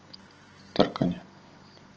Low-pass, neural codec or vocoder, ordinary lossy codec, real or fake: 7.2 kHz; none; Opus, 16 kbps; real